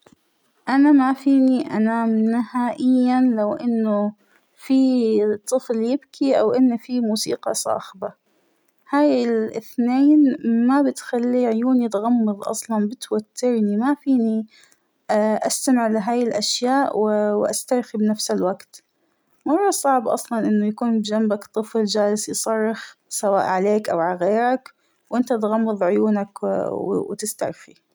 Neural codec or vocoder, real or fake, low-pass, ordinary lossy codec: none; real; none; none